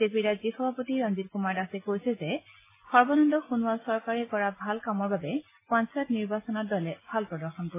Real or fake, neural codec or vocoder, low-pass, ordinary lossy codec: real; none; 3.6 kHz; MP3, 16 kbps